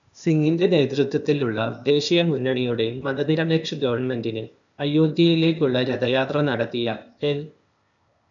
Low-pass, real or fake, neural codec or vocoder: 7.2 kHz; fake; codec, 16 kHz, 0.8 kbps, ZipCodec